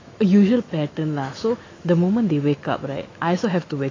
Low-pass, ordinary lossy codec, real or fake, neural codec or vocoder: 7.2 kHz; AAC, 32 kbps; fake; autoencoder, 48 kHz, 128 numbers a frame, DAC-VAE, trained on Japanese speech